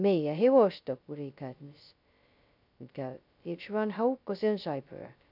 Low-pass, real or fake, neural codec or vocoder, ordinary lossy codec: 5.4 kHz; fake; codec, 16 kHz, 0.2 kbps, FocalCodec; none